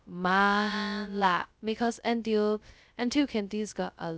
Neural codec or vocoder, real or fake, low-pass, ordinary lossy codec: codec, 16 kHz, 0.2 kbps, FocalCodec; fake; none; none